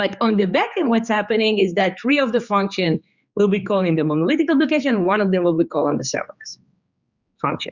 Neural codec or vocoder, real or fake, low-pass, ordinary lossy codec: codec, 16 kHz, 4 kbps, X-Codec, HuBERT features, trained on general audio; fake; 7.2 kHz; Opus, 64 kbps